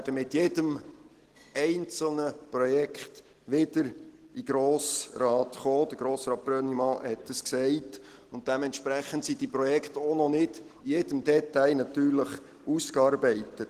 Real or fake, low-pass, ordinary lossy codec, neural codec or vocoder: real; 14.4 kHz; Opus, 16 kbps; none